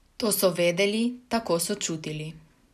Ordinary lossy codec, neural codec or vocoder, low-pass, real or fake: none; none; 14.4 kHz; real